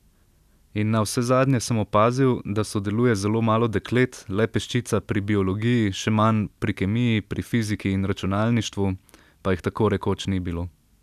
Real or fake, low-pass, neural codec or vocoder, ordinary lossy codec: real; 14.4 kHz; none; none